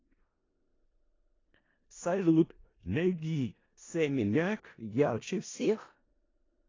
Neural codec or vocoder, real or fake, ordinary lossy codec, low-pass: codec, 16 kHz in and 24 kHz out, 0.4 kbps, LongCat-Audio-Codec, four codebook decoder; fake; AAC, 32 kbps; 7.2 kHz